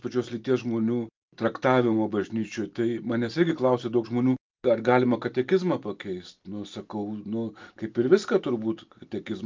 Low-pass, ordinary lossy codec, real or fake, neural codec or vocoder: 7.2 kHz; Opus, 24 kbps; real; none